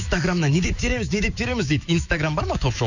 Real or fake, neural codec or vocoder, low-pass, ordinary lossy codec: fake; autoencoder, 48 kHz, 128 numbers a frame, DAC-VAE, trained on Japanese speech; 7.2 kHz; none